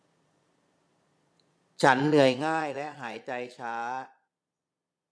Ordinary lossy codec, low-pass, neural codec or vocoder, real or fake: none; none; vocoder, 22.05 kHz, 80 mel bands, Vocos; fake